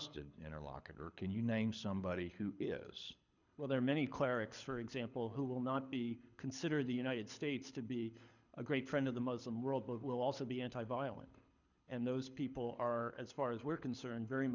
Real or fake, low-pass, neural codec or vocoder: fake; 7.2 kHz; codec, 24 kHz, 6 kbps, HILCodec